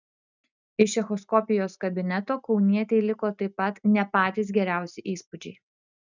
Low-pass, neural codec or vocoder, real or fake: 7.2 kHz; none; real